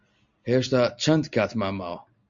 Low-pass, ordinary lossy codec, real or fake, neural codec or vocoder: 7.2 kHz; MP3, 64 kbps; real; none